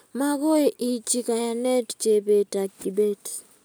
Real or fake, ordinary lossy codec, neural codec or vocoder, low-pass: real; none; none; none